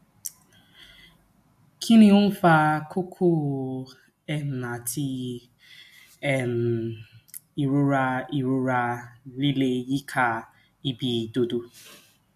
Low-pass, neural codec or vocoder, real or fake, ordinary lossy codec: 14.4 kHz; none; real; AAC, 96 kbps